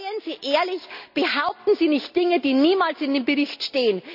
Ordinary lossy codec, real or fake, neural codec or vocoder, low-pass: none; real; none; 5.4 kHz